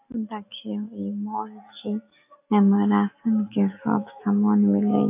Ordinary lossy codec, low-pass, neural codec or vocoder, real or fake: AAC, 24 kbps; 3.6 kHz; none; real